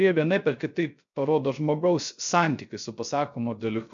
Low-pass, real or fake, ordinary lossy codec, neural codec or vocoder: 7.2 kHz; fake; MP3, 64 kbps; codec, 16 kHz, 0.3 kbps, FocalCodec